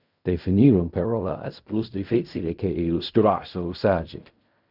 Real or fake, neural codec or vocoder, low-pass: fake; codec, 16 kHz in and 24 kHz out, 0.4 kbps, LongCat-Audio-Codec, fine tuned four codebook decoder; 5.4 kHz